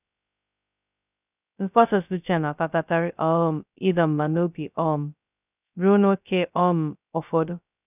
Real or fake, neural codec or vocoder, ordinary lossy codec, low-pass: fake; codec, 16 kHz, 0.2 kbps, FocalCodec; none; 3.6 kHz